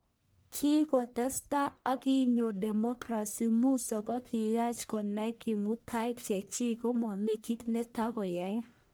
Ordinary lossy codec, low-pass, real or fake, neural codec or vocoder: none; none; fake; codec, 44.1 kHz, 1.7 kbps, Pupu-Codec